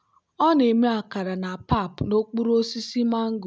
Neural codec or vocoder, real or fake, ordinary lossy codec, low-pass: none; real; none; none